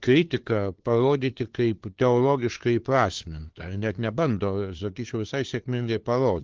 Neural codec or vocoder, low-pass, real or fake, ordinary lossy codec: codec, 16 kHz, 2 kbps, FunCodec, trained on LibriTTS, 25 frames a second; 7.2 kHz; fake; Opus, 16 kbps